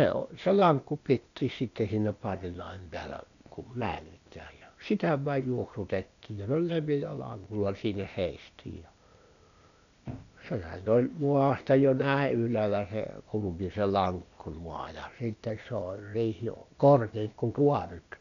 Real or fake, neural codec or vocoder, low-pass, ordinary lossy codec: fake; codec, 16 kHz, 0.8 kbps, ZipCodec; 7.2 kHz; none